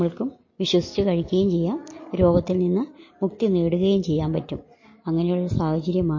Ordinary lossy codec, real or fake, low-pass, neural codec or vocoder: MP3, 32 kbps; real; 7.2 kHz; none